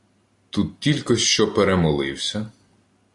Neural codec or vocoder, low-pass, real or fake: none; 10.8 kHz; real